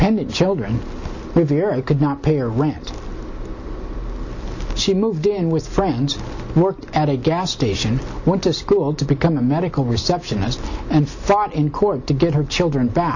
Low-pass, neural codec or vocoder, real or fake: 7.2 kHz; none; real